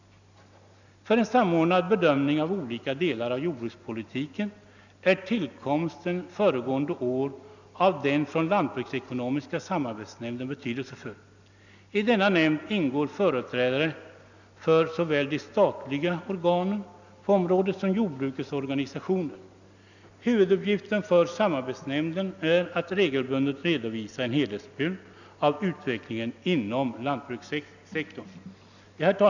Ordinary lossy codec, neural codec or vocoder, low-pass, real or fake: none; none; 7.2 kHz; real